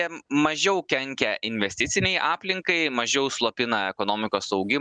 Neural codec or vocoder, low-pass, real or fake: none; 9.9 kHz; real